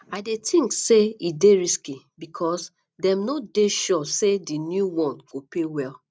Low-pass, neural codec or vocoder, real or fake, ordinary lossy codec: none; none; real; none